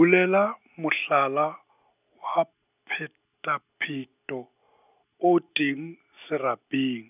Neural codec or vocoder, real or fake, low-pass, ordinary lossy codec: none; real; 3.6 kHz; none